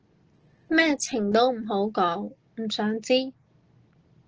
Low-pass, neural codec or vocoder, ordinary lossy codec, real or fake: 7.2 kHz; none; Opus, 16 kbps; real